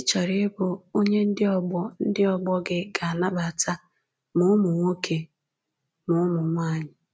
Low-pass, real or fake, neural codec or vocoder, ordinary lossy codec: none; real; none; none